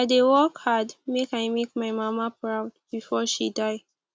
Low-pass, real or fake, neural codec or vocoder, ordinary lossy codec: none; real; none; none